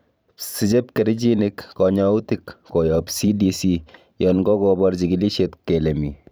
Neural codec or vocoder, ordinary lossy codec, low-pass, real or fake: vocoder, 44.1 kHz, 128 mel bands every 512 samples, BigVGAN v2; none; none; fake